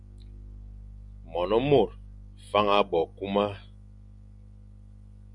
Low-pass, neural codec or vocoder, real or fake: 10.8 kHz; none; real